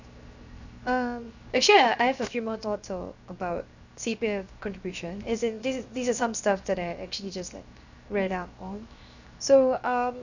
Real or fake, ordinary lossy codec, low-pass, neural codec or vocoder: fake; none; 7.2 kHz; codec, 16 kHz, 0.7 kbps, FocalCodec